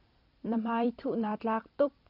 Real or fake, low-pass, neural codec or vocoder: fake; 5.4 kHz; vocoder, 44.1 kHz, 128 mel bands every 256 samples, BigVGAN v2